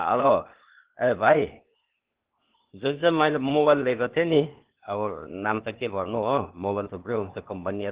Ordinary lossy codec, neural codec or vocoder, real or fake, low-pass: Opus, 16 kbps; codec, 16 kHz, 0.8 kbps, ZipCodec; fake; 3.6 kHz